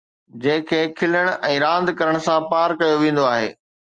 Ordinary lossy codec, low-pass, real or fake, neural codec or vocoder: Opus, 32 kbps; 9.9 kHz; real; none